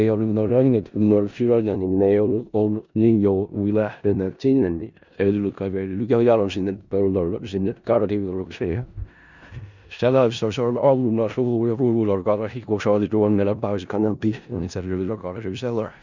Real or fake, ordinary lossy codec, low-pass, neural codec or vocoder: fake; none; 7.2 kHz; codec, 16 kHz in and 24 kHz out, 0.4 kbps, LongCat-Audio-Codec, four codebook decoder